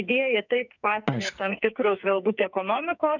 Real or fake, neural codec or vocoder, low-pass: fake; codec, 44.1 kHz, 2.6 kbps, SNAC; 7.2 kHz